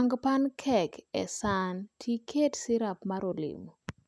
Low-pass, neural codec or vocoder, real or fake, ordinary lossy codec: none; none; real; none